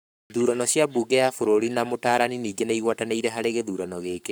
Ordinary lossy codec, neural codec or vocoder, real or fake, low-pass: none; codec, 44.1 kHz, 7.8 kbps, Pupu-Codec; fake; none